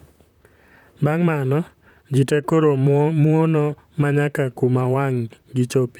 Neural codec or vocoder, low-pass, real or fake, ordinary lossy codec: vocoder, 44.1 kHz, 128 mel bands, Pupu-Vocoder; 19.8 kHz; fake; none